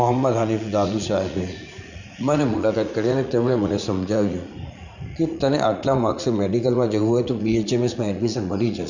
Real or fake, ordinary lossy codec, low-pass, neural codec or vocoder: fake; none; 7.2 kHz; vocoder, 44.1 kHz, 80 mel bands, Vocos